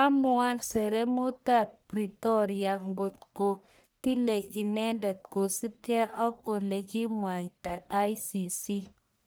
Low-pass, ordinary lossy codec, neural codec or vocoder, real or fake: none; none; codec, 44.1 kHz, 1.7 kbps, Pupu-Codec; fake